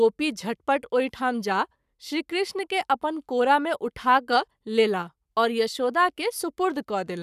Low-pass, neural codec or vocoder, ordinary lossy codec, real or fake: 14.4 kHz; codec, 44.1 kHz, 7.8 kbps, Pupu-Codec; none; fake